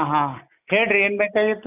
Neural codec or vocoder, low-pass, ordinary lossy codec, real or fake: none; 3.6 kHz; none; real